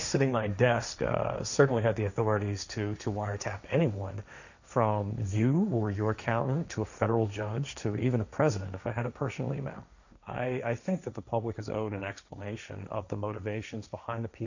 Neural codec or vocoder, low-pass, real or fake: codec, 16 kHz, 1.1 kbps, Voila-Tokenizer; 7.2 kHz; fake